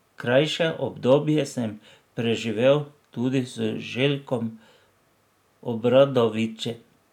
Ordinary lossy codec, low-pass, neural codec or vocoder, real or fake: none; 19.8 kHz; none; real